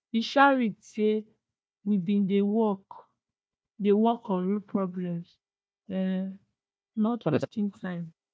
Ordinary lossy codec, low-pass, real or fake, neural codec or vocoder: none; none; fake; codec, 16 kHz, 1 kbps, FunCodec, trained on Chinese and English, 50 frames a second